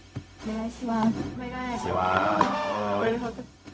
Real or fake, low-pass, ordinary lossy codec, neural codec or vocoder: fake; none; none; codec, 16 kHz, 0.4 kbps, LongCat-Audio-Codec